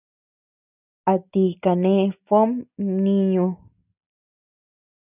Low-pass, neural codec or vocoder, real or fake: 3.6 kHz; none; real